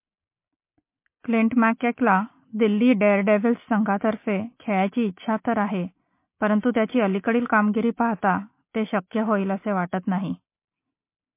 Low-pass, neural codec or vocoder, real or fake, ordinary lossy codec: 3.6 kHz; none; real; MP3, 24 kbps